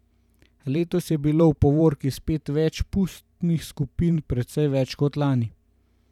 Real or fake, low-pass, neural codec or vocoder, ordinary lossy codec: fake; 19.8 kHz; vocoder, 44.1 kHz, 128 mel bands every 512 samples, BigVGAN v2; none